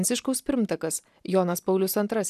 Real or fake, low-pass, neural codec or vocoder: real; 14.4 kHz; none